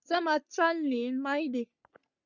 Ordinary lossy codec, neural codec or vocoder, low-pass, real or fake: Opus, 64 kbps; codec, 44.1 kHz, 3.4 kbps, Pupu-Codec; 7.2 kHz; fake